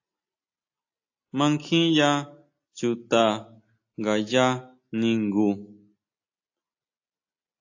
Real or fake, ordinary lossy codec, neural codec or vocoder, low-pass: real; AAC, 48 kbps; none; 7.2 kHz